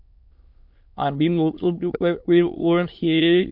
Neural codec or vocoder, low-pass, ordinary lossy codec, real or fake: autoencoder, 22.05 kHz, a latent of 192 numbers a frame, VITS, trained on many speakers; 5.4 kHz; MP3, 48 kbps; fake